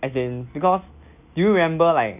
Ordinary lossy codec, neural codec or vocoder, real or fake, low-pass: none; none; real; 3.6 kHz